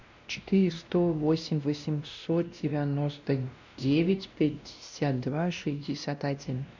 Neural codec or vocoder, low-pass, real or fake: codec, 16 kHz, 1 kbps, X-Codec, WavLM features, trained on Multilingual LibriSpeech; 7.2 kHz; fake